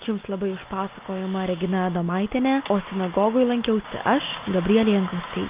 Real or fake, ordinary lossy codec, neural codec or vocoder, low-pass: real; Opus, 24 kbps; none; 3.6 kHz